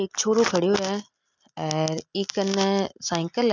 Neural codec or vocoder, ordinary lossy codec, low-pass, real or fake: none; none; 7.2 kHz; real